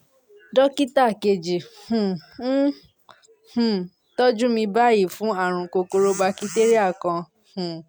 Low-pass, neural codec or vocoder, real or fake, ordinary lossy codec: none; none; real; none